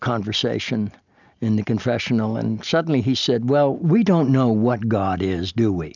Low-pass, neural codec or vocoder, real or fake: 7.2 kHz; none; real